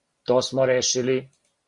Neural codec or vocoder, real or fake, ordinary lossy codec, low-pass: none; real; MP3, 48 kbps; 10.8 kHz